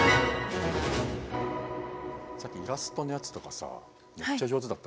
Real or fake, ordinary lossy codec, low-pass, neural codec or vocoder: real; none; none; none